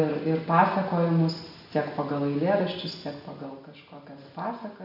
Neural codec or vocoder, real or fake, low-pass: none; real; 5.4 kHz